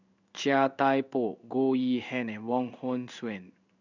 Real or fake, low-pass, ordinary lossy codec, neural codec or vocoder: fake; 7.2 kHz; none; codec, 16 kHz in and 24 kHz out, 1 kbps, XY-Tokenizer